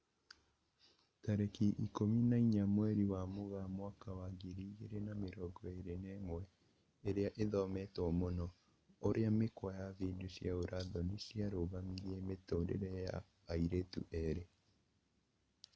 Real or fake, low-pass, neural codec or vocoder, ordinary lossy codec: real; none; none; none